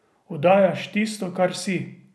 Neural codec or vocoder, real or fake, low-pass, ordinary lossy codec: none; real; none; none